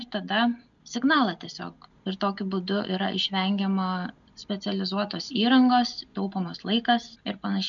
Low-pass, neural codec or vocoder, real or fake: 7.2 kHz; none; real